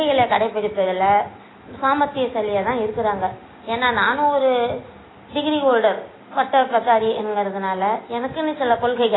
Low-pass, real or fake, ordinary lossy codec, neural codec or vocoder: 7.2 kHz; real; AAC, 16 kbps; none